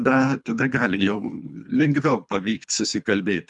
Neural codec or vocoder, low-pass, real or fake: codec, 24 kHz, 3 kbps, HILCodec; 10.8 kHz; fake